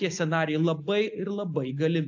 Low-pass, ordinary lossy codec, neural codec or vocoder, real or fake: 7.2 kHz; AAC, 48 kbps; none; real